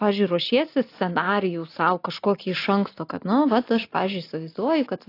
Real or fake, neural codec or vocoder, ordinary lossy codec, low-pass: real; none; AAC, 32 kbps; 5.4 kHz